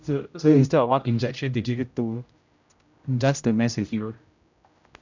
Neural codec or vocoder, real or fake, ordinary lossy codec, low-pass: codec, 16 kHz, 0.5 kbps, X-Codec, HuBERT features, trained on general audio; fake; none; 7.2 kHz